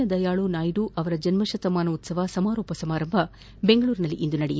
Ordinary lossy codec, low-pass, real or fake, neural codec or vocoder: none; none; real; none